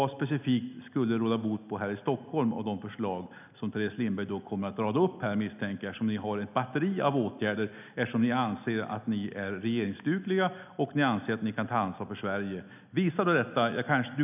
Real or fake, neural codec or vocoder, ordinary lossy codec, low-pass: real; none; none; 3.6 kHz